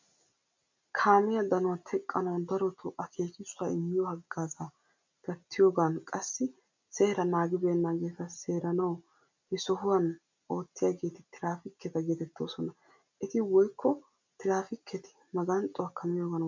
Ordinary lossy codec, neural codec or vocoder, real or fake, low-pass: MP3, 64 kbps; none; real; 7.2 kHz